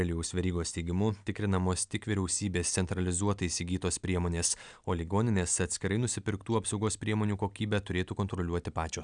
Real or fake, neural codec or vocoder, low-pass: real; none; 9.9 kHz